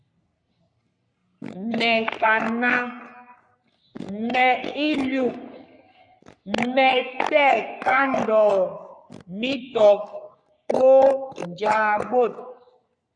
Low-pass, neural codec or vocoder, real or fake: 9.9 kHz; codec, 44.1 kHz, 3.4 kbps, Pupu-Codec; fake